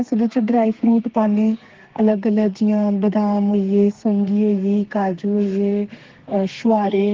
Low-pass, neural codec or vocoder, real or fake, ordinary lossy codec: 7.2 kHz; codec, 32 kHz, 1.9 kbps, SNAC; fake; Opus, 16 kbps